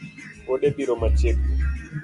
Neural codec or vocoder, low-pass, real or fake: none; 10.8 kHz; real